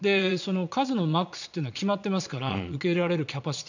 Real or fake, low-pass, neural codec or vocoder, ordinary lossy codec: fake; 7.2 kHz; vocoder, 22.05 kHz, 80 mel bands, Vocos; none